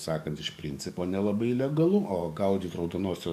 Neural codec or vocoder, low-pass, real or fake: codec, 44.1 kHz, 7.8 kbps, DAC; 14.4 kHz; fake